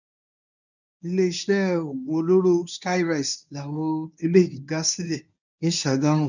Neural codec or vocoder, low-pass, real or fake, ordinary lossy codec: codec, 24 kHz, 0.9 kbps, WavTokenizer, medium speech release version 1; 7.2 kHz; fake; none